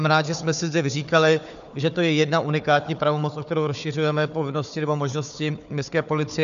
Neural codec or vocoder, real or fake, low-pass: codec, 16 kHz, 4 kbps, FunCodec, trained on Chinese and English, 50 frames a second; fake; 7.2 kHz